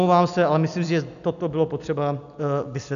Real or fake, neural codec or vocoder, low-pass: real; none; 7.2 kHz